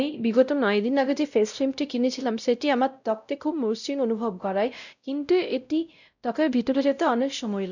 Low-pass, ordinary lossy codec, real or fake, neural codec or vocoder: 7.2 kHz; none; fake; codec, 16 kHz, 0.5 kbps, X-Codec, WavLM features, trained on Multilingual LibriSpeech